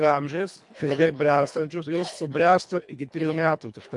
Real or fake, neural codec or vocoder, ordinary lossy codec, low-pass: fake; codec, 24 kHz, 1.5 kbps, HILCodec; MP3, 64 kbps; 10.8 kHz